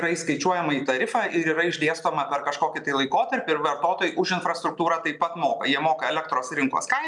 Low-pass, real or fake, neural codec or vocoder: 10.8 kHz; real; none